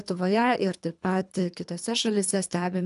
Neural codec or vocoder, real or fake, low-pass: codec, 24 kHz, 3 kbps, HILCodec; fake; 10.8 kHz